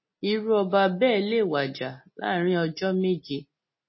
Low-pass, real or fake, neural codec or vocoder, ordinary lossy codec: 7.2 kHz; real; none; MP3, 24 kbps